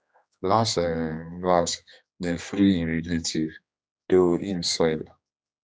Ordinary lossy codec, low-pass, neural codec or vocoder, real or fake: none; none; codec, 16 kHz, 2 kbps, X-Codec, HuBERT features, trained on general audio; fake